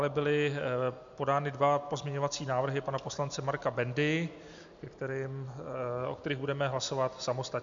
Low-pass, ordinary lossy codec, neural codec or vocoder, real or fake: 7.2 kHz; MP3, 64 kbps; none; real